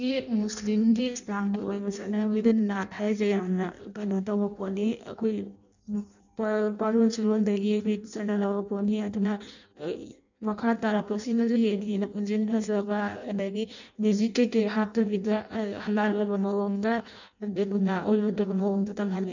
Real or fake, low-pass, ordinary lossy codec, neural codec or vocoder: fake; 7.2 kHz; none; codec, 16 kHz in and 24 kHz out, 0.6 kbps, FireRedTTS-2 codec